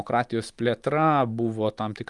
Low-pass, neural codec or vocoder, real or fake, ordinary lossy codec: 10.8 kHz; none; real; Opus, 32 kbps